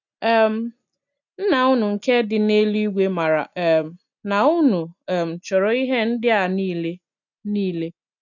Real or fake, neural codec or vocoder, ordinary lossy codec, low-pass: real; none; none; 7.2 kHz